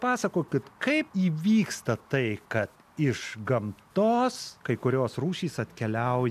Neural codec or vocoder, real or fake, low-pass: none; real; 14.4 kHz